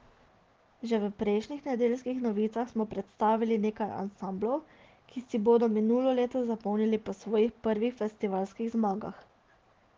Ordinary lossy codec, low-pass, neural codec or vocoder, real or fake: Opus, 16 kbps; 7.2 kHz; none; real